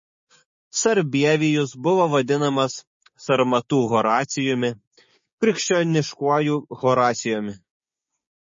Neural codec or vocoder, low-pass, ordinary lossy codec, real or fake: codec, 16 kHz, 6 kbps, DAC; 7.2 kHz; MP3, 32 kbps; fake